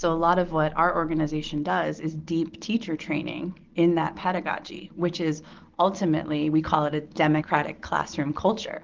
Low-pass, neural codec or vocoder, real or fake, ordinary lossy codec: 7.2 kHz; none; real; Opus, 16 kbps